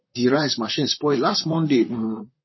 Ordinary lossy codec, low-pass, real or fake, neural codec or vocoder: MP3, 24 kbps; 7.2 kHz; fake; vocoder, 44.1 kHz, 128 mel bands, Pupu-Vocoder